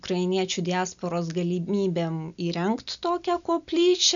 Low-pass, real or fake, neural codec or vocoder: 7.2 kHz; real; none